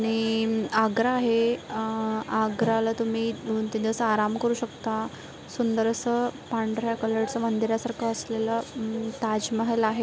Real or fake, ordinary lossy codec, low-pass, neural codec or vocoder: real; none; none; none